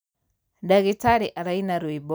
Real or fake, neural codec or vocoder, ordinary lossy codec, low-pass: real; none; none; none